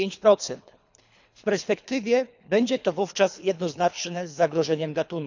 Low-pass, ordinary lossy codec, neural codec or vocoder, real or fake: 7.2 kHz; none; codec, 24 kHz, 3 kbps, HILCodec; fake